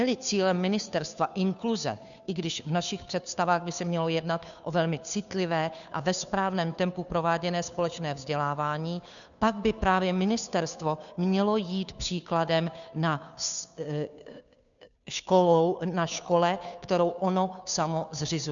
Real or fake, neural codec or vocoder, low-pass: fake; codec, 16 kHz, 2 kbps, FunCodec, trained on Chinese and English, 25 frames a second; 7.2 kHz